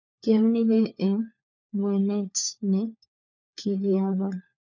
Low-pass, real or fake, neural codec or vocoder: 7.2 kHz; fake; codec, 16 kHz, 4 kbps, FunCodec, trained on LibriTTS, 50 frames a second